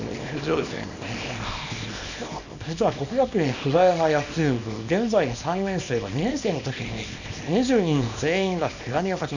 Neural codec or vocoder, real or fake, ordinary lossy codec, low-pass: codec, 24 kHz, 0.9 kbps, WavTokenizer, small release; fake; none; 7.2 kHz